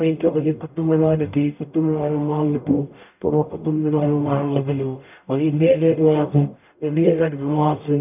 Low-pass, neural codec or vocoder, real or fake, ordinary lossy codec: 3.6 kHz; codec, 44.1 kHz, 0.9 kbps, DAC; fake; none